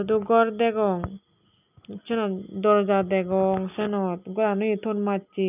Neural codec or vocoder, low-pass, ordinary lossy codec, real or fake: none; 3.6 kHz; none; real